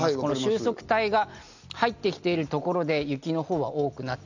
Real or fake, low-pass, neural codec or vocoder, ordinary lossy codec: real; 7.2 kHz; none; none